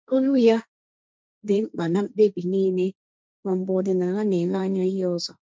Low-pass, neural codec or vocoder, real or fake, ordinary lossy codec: none; codec, 16 kHz, 1.1 kbps, Voila-Tokenizer; fake; none